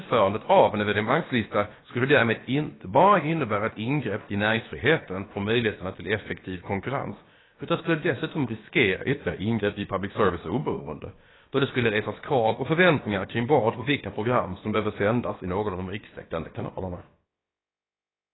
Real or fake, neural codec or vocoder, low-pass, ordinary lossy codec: fake; codec, 16 kHz, about 1 kbps, DyCAST, with the encoder's durations; 7.2 kHz; AAC, 16 kbps